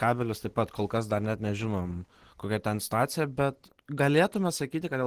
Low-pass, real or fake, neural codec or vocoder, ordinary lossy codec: 14.4 kHz; real; none; Opus, 16 kbps